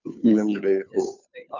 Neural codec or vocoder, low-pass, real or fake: codec, 16 kHz, 2 kbps, FunCodec, trained on Chinese and English, 25 frames a second; 7.2 kHz; fake